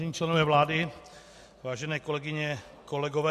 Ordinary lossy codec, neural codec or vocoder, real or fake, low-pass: MP3, 64 kbps; none; real; 14.4 kHz